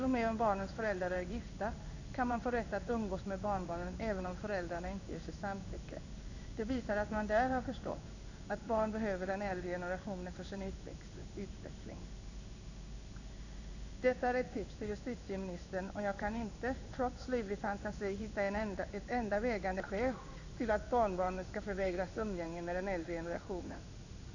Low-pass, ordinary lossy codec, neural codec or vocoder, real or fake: 7.2 kHz; none; codec, 16 kHz in and 24 kHz out, 1 kbps, XY-Tokenizer; fake